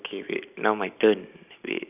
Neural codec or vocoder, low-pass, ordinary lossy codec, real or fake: none; 3.6 kHz; none; real